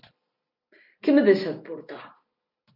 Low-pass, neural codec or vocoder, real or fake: 5.4 kHz; none; real